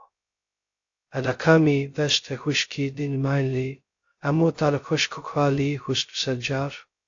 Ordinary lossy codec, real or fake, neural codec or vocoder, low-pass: AAC, 48 kbps; fake; codec, 16 kHz, 0.2 kbps, FocalCodec; 7.2 kHz